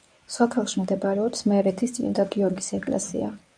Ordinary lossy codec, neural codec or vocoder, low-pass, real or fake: MP3, 64 kbps; codec, 24 kHz, 0.9 kbps, WavTokenizer, medium speech release version 1; 9.9 kHz; fake